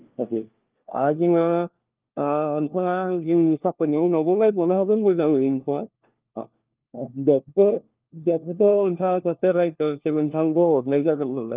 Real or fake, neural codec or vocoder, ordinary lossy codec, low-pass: fake; codec, 16 kHz, 1 kbps, FunCodec, trained on LibriTTS, 50 frames a second; Opus, 32 kbps; 3.6 kHz